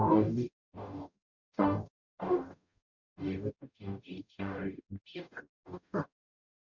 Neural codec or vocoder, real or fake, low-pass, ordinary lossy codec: codec, 44.1 kHz, 0.9 kbps, DAC; fake; 7.2 kHz; none